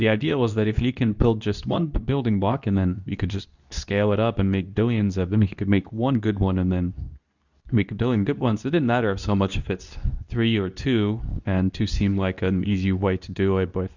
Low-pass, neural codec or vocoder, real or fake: 7.2 kHz; codec, 24 kHz, 0.9 kbps, WavTokenizer, medium speech release version 2; fake